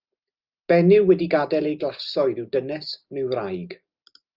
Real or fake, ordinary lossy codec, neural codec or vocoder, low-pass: real; Opus, 24 kbps; none; 5.4 kHz